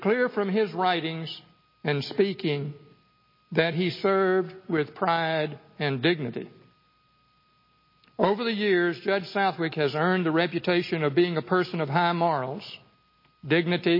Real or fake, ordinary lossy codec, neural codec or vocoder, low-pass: real; MP3, 24 kbps; none; 5.4 kHz